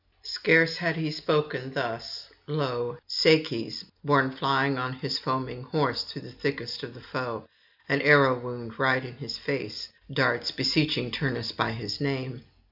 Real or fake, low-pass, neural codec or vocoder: real; 5.4 kHz; none